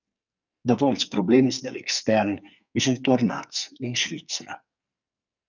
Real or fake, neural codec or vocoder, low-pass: fake; codec, 44.1 kHz, 2.6 kbps, SNAC; 7.2 kHz